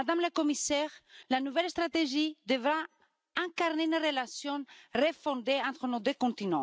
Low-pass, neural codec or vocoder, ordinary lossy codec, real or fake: none; none; none; real